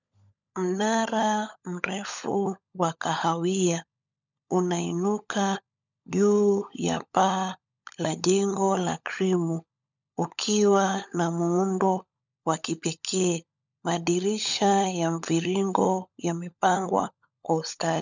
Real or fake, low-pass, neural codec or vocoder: fake; 7.2 kHz; codec, 16 kHz, 16 kbps, FunCodec, trained on LibriTTS, 50 frames a second